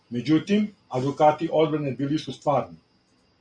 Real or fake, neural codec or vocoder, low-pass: real; none; 9.9 kHz